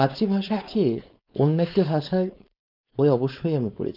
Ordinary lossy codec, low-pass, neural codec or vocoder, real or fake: none; 5.4 kHz; codec, 16 kHz, 4.8 kbps, FACodec; fake